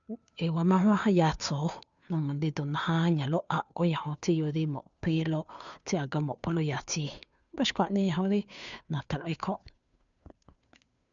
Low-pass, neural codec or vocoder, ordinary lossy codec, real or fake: 7.2 kHz; codec, 16 kHz, 2 kbps, FunCodec, trained on Chinese and English, 25 frames a second; none; fake